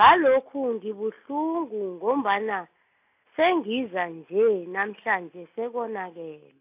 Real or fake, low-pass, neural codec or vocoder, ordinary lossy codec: real; 3.6 kHz; none; AAC, 32 kbps